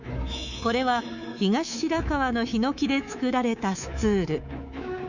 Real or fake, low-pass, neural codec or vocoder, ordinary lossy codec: fake; 7.2 kHz; codec, 24 kHz, 3.1 kbps, DualCodec; none